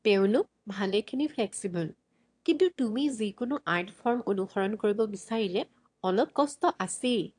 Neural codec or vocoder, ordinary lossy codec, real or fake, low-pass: autoencoder, 22.05 kHz, a latent of 192 numbers a frame, VITS, trained on one speaker; AAC, 64 kbps; fake; 9.9 kHz